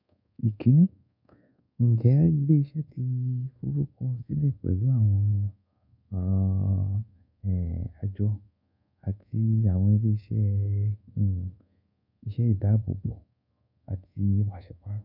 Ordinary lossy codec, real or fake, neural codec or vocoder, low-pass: none; fake; codec, 24 kHz, 1.2 kbps, DualCodec; 5.4 kHz